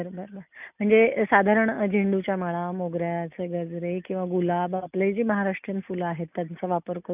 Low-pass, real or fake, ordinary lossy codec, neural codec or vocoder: 3.6 kHz; real; none; none